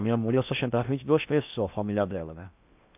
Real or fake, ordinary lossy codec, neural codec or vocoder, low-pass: fake; AAC, 32 kbps; codec, 16 kHz in and 24 kHz out, 0.6 kbps, FocalCodec, streaming, 4096 codes; 3.6 kHz